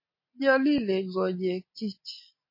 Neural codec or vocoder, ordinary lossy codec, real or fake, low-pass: none; MP3, 24 kbps; real; 5.4 kHz